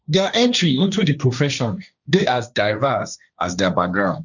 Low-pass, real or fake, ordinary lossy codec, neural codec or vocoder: 7.2 kHz; fake; none; codec, 16 kHz, 1.1 kbps, Voila-Tokenizer